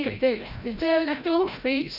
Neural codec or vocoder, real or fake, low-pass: codec, 16 kHz, 0.5 kbps, FreqCodec, larger model; fake; 5.4 kHz